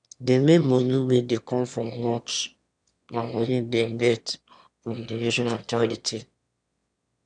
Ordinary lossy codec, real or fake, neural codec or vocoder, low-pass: none; fake; autoencoder, 22.05 kHz, a latent of 192 numbers a frame, VITS, trained on one speaker; 9.9 kHz